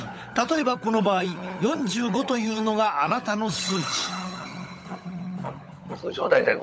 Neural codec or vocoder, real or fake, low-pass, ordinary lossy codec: codec, 16 kHz, 16 kbps, FunCodec, trained on LibriTTS, 50 frames a second; fake; none; none